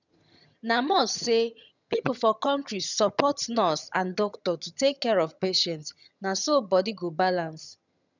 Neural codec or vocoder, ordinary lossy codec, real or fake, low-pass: vocoder, 22.05 kHz, 80 mel bands, HiFi-GAN; none; fake; 7.2 kHz